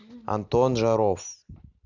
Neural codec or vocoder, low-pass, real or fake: none; 7.2 kHz; real